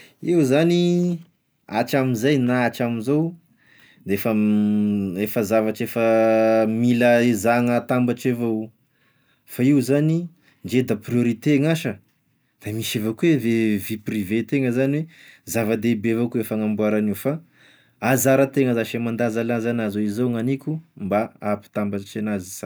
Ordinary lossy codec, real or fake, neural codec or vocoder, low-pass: none; real; none; none